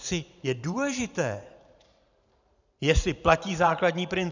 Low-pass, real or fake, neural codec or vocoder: 7.2 kHz; fake; vocoder, 44.1 kHz, 128 mel bands every 512 samples, BigVGAN v2